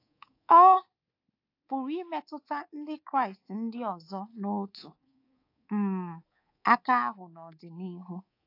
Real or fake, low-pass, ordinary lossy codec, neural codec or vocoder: fake; 5.4 kHz; AAC, 32 kbps; codec, 24 kHz, 3.1 kbps, DualCodec